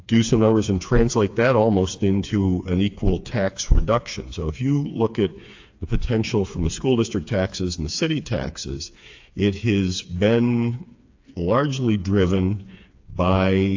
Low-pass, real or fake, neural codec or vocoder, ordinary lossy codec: 7.2 kHz; fake; codec, 16 kHz, 4 kbps, FreqCodec, smaller model; AAC, 48 kbps